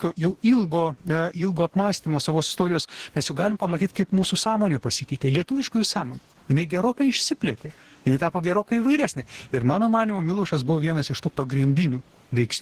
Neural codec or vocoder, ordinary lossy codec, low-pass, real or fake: codec, 44.1 kHz, 2.6 kbps, DAC; Opus, 16 kbps; 14.4 kHz; fake